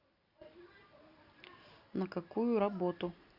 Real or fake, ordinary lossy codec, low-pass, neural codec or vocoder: real; Opus, 64 kbps; 5.4 kHz; none